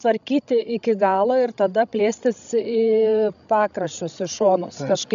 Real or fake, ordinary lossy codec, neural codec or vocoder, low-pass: fake; AAC, 96 kbps; codec, 16 kHz, 16 kbps, FreqCodec, larger model; 7.2 kHz